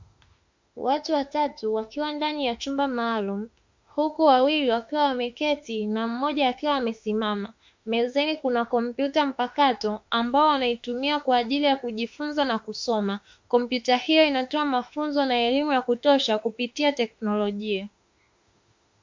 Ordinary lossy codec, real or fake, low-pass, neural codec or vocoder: MP3, 48 kbps; fake; 7.2 kHz; autoencoder, 48 kHz, 32 numbers a frame, DAC-VAE, trained on Japanese speech